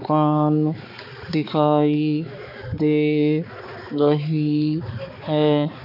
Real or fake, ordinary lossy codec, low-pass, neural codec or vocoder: fake; none; 5.4 kHz; codec, 16 kHz, 4 kbps, X-Codec, HuBERT features, trained on balanced general audio